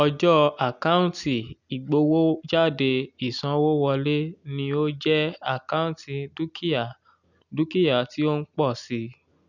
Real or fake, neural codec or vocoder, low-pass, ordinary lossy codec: real; none; 7.2 kHz; none